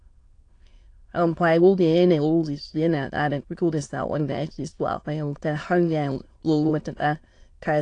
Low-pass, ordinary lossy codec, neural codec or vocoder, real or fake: 9.9 kHz; AAC, 48 kbps; autoencoder, 22.05 kHz, a latent of 192 numbers a frame, VITS, trained on many speakers; fake